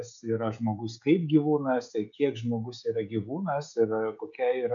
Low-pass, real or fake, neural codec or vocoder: 7.2 kHz; fake; codec, 16 kHz, 16 kbps, FreqCodec, smaller model